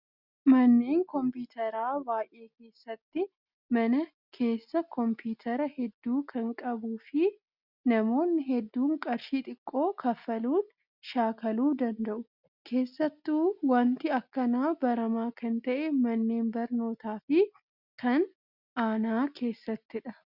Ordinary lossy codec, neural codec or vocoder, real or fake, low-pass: Opus, 64 kbps; none; real; 5.4 kHz